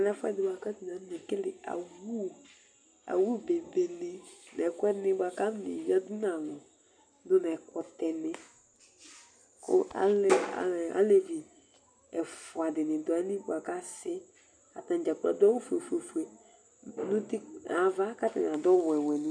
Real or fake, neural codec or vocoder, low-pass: real; none; 9.9 kHz